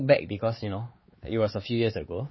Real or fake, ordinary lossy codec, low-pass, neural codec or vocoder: fake; MP3, 24 kbps; 7.2 kHz; codec, 16 kHz, 4 kbps, X-Codec, WavLM features, trained on Multilingual LibriSpeech